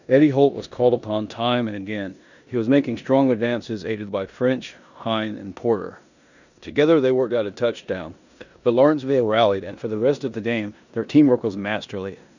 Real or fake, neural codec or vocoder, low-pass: fake; codec, 16 kHz in and 24 kHz out, 0.9 kbps, LongCat-Audio-Codec, four codebook decoder; 7.2 kHz